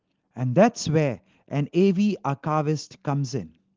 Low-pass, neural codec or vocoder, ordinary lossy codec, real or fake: 7.2 kHz; none; Opus, 16 kbps; real